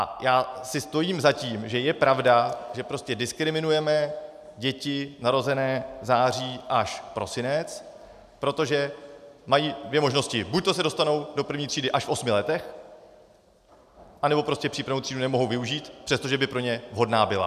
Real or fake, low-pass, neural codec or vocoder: real; 14.4 kHz; none